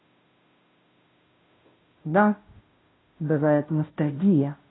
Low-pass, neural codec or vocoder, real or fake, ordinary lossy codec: 7.2 kHz; codec, 16 kHz, 0.5 kbps, FunCodec, trained on Chinese and English, 25 frames a second; fake; AAC, 16 kbps